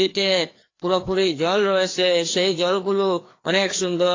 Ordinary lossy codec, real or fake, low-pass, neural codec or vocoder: AAC, 32 kbps; fake; 7.2 kHz; codec, 16 kHz, 2 kbps, FreqCodec, larger model